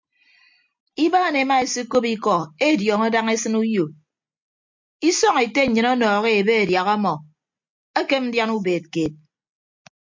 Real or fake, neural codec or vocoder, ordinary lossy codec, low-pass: real; none; MP3, 64 kbps; 7.2 kHz